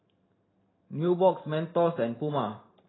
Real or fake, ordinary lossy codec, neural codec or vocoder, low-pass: fake; AAC, 16 kbps; vocoder, 44.1 kHz, 128 mel bands every 512 samples, BigVGAN v2; 7.2 kHz